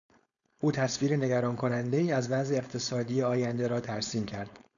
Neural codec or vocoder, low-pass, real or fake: codec, 16 kHz, 4.8 kbps, FACodec; 7.2 kHz; fake